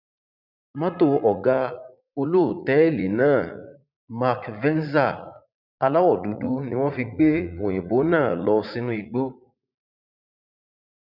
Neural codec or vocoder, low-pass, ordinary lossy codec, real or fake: vocoder, 44.1 kHz, 80 mel bands, Vocos; 5.4 kHz; none; fake